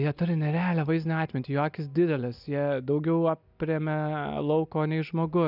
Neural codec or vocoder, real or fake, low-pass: none; real; 5.4 kHz